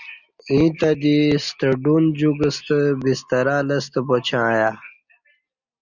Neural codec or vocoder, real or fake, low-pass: none; real; 7.2 kHz